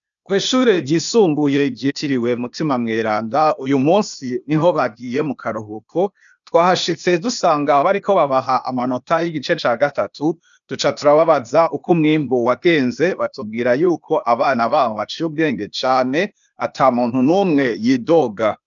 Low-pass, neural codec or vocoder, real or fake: 7.2 kHz; codec, 16 kHz, 0.8 kbps, ZipCodec; fake